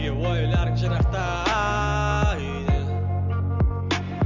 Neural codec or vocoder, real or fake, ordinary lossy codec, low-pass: none; real; none; 7.2 kHz